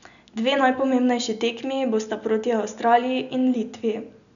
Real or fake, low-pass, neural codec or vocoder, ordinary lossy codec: real; 7.2 kHz; none; none